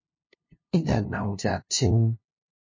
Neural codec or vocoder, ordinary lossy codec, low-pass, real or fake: codec, 16 kHz, 0.5 kbps, FunCodec, trained on LibriTTS, 25 frames a second; MP3, 32 kbps; 7.2 kHz; fake